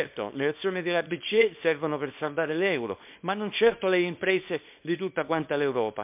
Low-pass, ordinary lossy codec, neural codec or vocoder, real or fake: 3.6 kHz; MP3, 32 kbps; codec, 24 kHz, 0.9 kbps, WavTokenizer, small release; fake